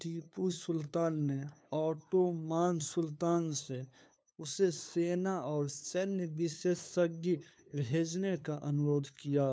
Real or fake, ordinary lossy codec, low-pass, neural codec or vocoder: fake; none; none; codec, 16 kHz, 2 kbps, FunCodec, trained on LibriTTS, 25 frames a second